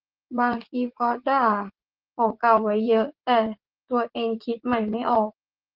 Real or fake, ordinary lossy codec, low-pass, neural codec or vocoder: fake; Opus, 16 kbps; 5.4 kHz; vocoder, 44.1 kHz, 80 mel bands, Vocos